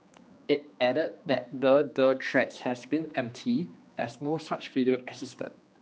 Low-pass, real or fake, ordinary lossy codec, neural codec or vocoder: none; fake; none; codec, 16 kHz, 2 kbps, X-Codec, HuBERT features, trained on general audio